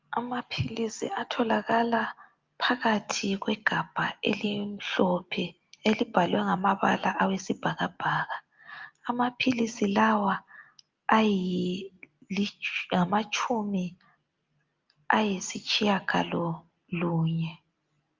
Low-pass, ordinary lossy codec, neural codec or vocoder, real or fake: 7.2 kHz; Opus, 24 kbps; none; real